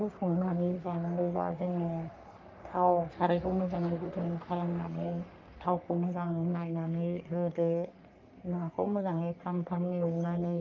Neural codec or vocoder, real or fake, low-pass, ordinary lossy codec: codec, 44.1 kHz, 3.4 kbps, Pupu-Codec; fake; 7.2 kHz; Opus, 24 kbps